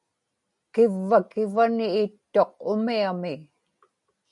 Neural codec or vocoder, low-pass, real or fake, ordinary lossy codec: none; 10.8 kHz; real; MP3, 96 kbps